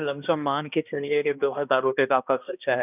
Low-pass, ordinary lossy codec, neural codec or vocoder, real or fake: 3.6 kHz; none; codec, 16 kHz, 1 kbps, X-Codec, HuBERT features, trained on balanced general audio; fake